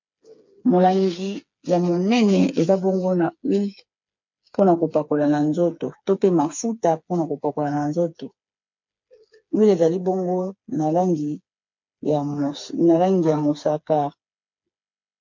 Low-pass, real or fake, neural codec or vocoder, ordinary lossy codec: 7.2 kHz; fake; codec, 16 kHz, 4 kbps, FreqCodec, smaller model; MP3, 48 kbps